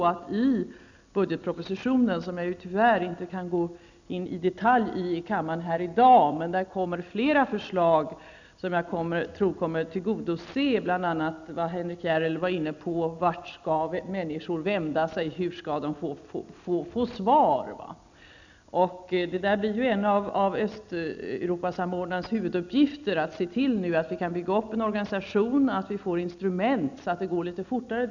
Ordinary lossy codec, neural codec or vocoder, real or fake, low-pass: none; none; real; 7.2 kHz